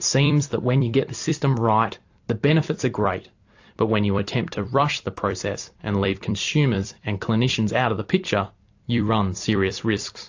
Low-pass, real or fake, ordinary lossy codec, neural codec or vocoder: 7.2 kHz; fake; AAC, 48 kbps; vocoder, 44.1 kHz, 128 mel bands every 256 samples, BigVGAN v2